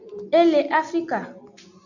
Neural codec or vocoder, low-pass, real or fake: none; 7.2 kHz; real